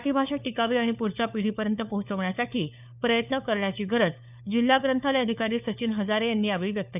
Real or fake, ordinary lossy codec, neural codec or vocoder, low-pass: fake; none; codec, 16 kHz, 4 kbps, FunCodec, trained on LibriTTS, 50 frames a second; 3.6 kHz